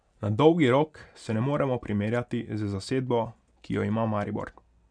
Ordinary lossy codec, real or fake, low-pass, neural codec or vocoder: none; real; 9.9 kHz; none